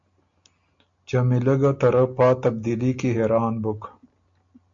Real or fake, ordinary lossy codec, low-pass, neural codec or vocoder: real; MP3, 48 kbps; 7.2 kHz; none